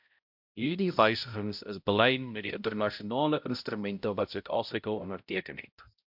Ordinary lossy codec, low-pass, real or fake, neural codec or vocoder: MP3, 48 kbps; 5.4 kHz; fake; codec, 16 kHz, 0.5 kbps, X-Codec, HuBERT features, trained on balanced general audio